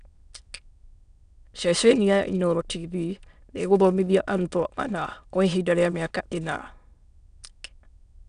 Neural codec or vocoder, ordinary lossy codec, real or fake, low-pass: autoencoder, 22.05 kHz, a latent of 192 numbers a frame, VITS, trained on many speakers; none; fake; 9.9 kHz